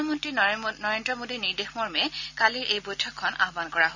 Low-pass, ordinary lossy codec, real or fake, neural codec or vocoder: 7.2 kHz; none; real; none